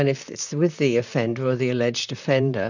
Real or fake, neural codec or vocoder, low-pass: fake; vocoder, 44.1 kHz, 128 mel bands, Pupu-Vocoder; 7.2 kHz